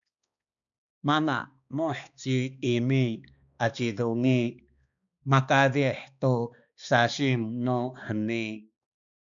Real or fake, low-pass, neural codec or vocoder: fake; 7.2 kHz; codec, 16 kHz, 2 kbps, X-Codec, HuBERT features, trained on balanced general audio